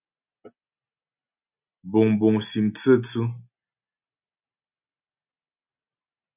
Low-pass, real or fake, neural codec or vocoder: 3.6 kHz; real; none